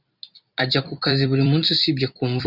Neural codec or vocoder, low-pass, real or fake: vocoder, 44.1 kHz, 128 mel bands every 256 samples, BigVGAN v2; 5.4 kHz; fake